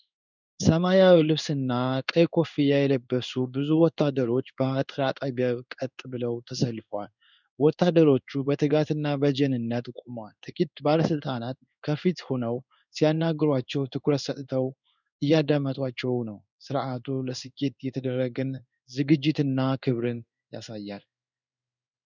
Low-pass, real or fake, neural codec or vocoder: 7.2 kHz; fake; codec, 16 kHz in and 24 kHz out, 1 kbps, XY-Tokenizer